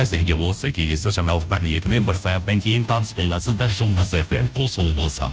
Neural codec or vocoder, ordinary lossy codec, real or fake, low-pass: codec, 16 kHz, 0.5 kbps, FunCodec, trained on Chinese and English, 25 frames a second; none; fake; none